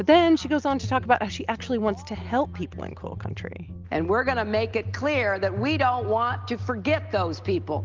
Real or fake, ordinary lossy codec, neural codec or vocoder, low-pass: real; Opus, 24 kbps; none; 7.2 kHz